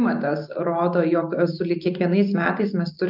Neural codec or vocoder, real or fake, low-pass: none; real; 5.4 kHz